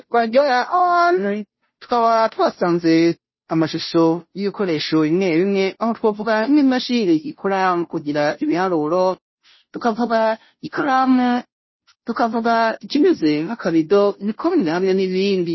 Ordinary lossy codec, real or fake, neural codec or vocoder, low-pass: MP3, 24 kbps; fake; codec, 16 kHz, 0.5 kbps, FunCodec, trained on Chinese and English, 25 frames a second; 7.2 kHz